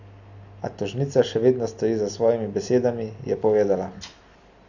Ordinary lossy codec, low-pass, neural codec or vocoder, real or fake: none; 7.2 kHz; none; real